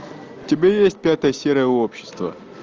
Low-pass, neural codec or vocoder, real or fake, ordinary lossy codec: 7.2 kHz; none; real; Opus, 24 kbps